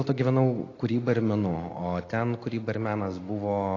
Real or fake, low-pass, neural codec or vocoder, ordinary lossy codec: real; 7.2 kHz; none; AAC, 32 kbps